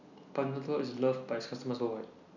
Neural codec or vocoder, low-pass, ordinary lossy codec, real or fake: none; 7.2 kHz; none; real